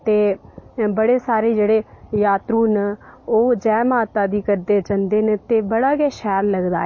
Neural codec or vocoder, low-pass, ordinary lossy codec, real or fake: none; 7.2 kHz; MP3, 32 kbps; real